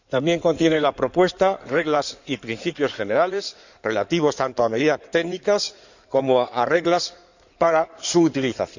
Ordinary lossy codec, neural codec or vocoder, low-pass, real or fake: none; codec, 16 kHz in and 24 kHz out, 2.2 kbps, FireRedTTS-2 codec; 7.2 kHz; fake